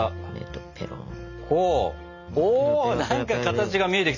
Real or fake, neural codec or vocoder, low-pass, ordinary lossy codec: real; none; 7.2 kHz; none